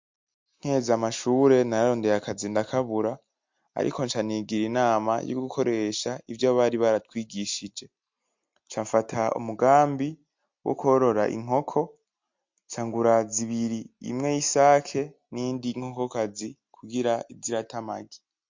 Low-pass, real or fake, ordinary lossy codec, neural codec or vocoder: 7.2 kHz; real; MP3, 48 kbps; none